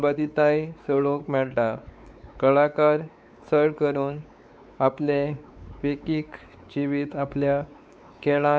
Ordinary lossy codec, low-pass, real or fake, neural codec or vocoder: none; none; fake; codec, 16 kHz, 4 kbps, X-Codec, WavLM features, trained on Multilingual LibriSpeech